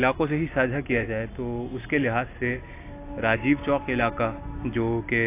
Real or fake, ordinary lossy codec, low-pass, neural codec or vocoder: real; AAC, 24 kbps; 3.6 kHz; none